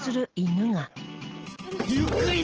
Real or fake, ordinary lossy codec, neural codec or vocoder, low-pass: real; Opus, 16 kbps; none; 7.2 kHz